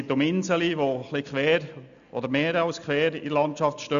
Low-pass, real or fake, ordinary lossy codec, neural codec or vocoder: 7.2 kHz; real; none; none